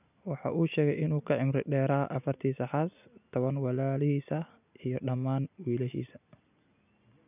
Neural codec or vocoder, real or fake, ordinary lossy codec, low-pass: none; real; none; 3.6 kHz